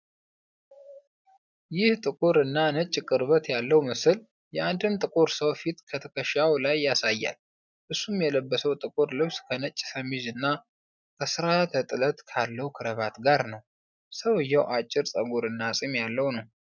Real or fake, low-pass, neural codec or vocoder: real; 7.2 kHz; none